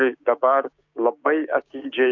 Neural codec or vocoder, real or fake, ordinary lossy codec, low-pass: codec, 16 kHz, 6 kbps, DAC; fake; MP3, 64 kbps; 7.2 kHz